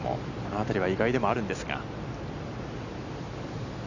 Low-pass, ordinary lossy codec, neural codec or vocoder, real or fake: 7.2 kHz; none; none; real